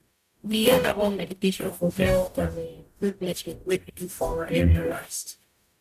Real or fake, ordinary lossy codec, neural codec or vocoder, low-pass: fake; none; codec, 44.1 kHz, 0.9 kbps, DAC; 14.4 kHz